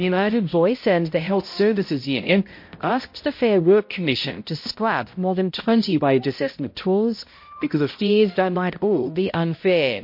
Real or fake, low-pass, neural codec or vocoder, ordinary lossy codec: fake; 5.4 kHz; codec, 16 kHz, 0.5 kbps, X-Codec, HuBERT features, trained on balanced general audio; MP3, 32 kbps